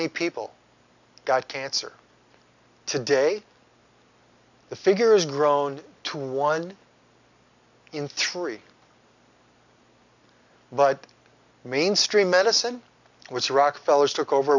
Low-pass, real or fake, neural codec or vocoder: 7.2 kHz; real; none